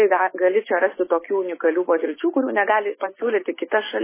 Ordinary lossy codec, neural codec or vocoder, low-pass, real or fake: MP3, 16 kbps; none; 3.6 kHz; real